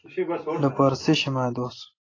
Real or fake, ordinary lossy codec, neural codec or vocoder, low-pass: real; AAC, 32 kbps; none; 7.2 kHz